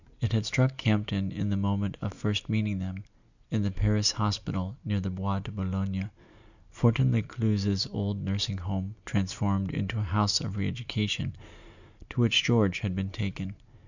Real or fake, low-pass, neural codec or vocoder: real; 7.2 kHz; none